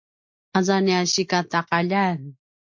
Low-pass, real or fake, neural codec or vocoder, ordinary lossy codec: 7.2 kHz; real; none; MP3, 48 kbps